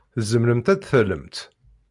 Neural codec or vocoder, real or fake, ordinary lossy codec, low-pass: none; real; MP3, 64 kbps; 10.8 kHz